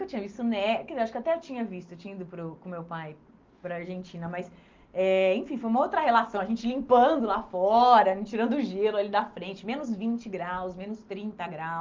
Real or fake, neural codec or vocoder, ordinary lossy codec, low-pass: real; none; Opus, 32 kbps; 7.2 kHz